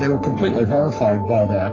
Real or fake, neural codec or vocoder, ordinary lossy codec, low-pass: fake; codec, 44.1 kHz, 3.4 kbps, Pupu-Codec; AAC, 32 kbps; 7.2 kHz